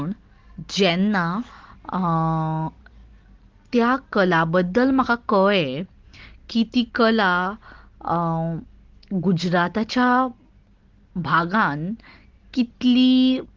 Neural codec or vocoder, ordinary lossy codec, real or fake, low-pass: none; Opus, 16 kbps; real; 7.2 kHz